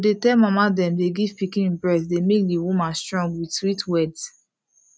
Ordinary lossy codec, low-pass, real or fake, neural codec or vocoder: none; none; real; none